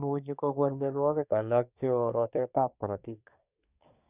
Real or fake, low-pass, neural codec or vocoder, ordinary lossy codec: fake; 3.6 kHz; codec, 24 kHz, 1 kbps, SNAC; none